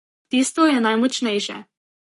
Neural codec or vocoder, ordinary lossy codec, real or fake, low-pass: codec, 44.1 kHz, 7.8 kbps, Pupu-Codec; MP3, 48 kbps; fake; 14.4 kHz